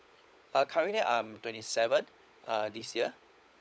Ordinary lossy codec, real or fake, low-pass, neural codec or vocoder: none; fake; none; codec, 16 kHz, 16 kbps, FunCodec, trained on LibriTTS, 50 frames a second